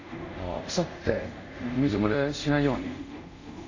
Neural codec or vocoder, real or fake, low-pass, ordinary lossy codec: codec, 24 kHz, 0.5 kbps, DualCodec; fake; 7.2 kHz; AAC, 48 kbps